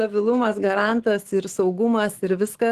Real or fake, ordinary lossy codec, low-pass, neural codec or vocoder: fake; Opus, 16 kbps; 14.4 kHz; autoencoder, 48 kHz, 128 numbers a frame, DAC-VAE, trained on Japanese speech